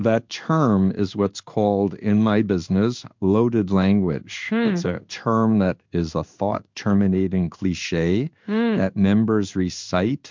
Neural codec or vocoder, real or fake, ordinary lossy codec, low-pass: codec, 16 kHz in and 24 kHz out, 1 kbps, XY-Tokenizer; fake; MP3, 64 kbps; 7.2 kHz